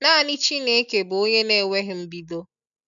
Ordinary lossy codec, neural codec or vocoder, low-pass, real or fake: none; none; 7.2 kHz; real